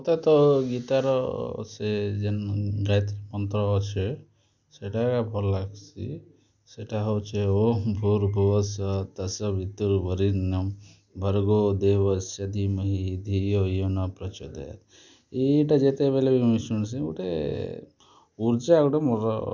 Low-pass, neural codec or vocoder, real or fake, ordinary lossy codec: 7.2 kHz; none; real; none